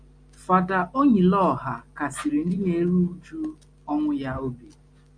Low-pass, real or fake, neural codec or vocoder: 9.9 kHz; real; none